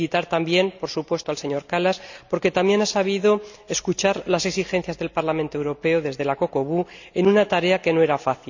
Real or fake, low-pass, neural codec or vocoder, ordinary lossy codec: real; 7.2 kHz; none; none